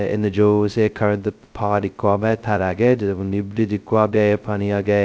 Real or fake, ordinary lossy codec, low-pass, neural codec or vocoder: fake; none; none; codec, 16 kHz, 0.2 kbps, FocalCodec